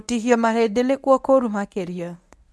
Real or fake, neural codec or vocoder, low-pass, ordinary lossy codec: fake; codec, 24 kHz, 0.9 kbps, WavTokenizer, medium speech release version 1; none; none